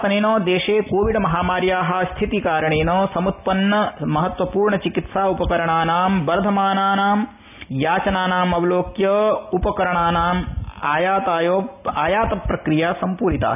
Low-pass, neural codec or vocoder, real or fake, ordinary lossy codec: 3.6 kHz; none; real; none